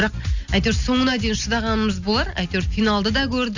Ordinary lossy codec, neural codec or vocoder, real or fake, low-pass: none; none; real; 7.2 kHz